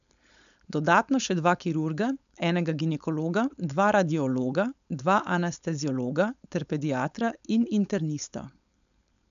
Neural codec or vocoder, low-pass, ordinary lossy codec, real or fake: codec, 16 kHz, 4.8 kbps, FACodec; 7.2 kHz; none; fake